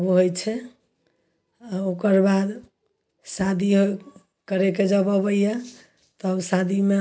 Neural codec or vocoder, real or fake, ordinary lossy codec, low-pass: none; real; none; none